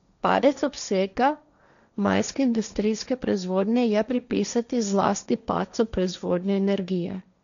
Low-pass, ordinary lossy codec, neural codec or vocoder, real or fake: 7.2 kHz; none; codec, 16 kHz, 1.1 kbps, Voila-Tokenizer; fake